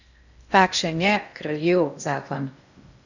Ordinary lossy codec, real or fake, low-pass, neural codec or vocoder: none; fake; 7.2 kHz; codec, 16 kHz in and 24 kHz out, 0.6 kbps, FocalCodec, streaming, 2048 codes